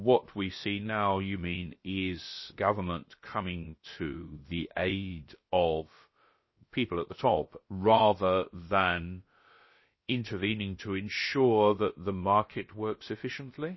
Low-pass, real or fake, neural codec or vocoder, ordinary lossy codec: 7.2 kHz; fake; codec, 16 kHz, about 1 kbps, DyCAST, with the encoder's durations; MP3, 24 kbps